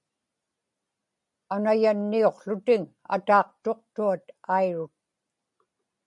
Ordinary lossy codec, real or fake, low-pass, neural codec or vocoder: MP3, 96 kbps; real; 10.8 kHz; none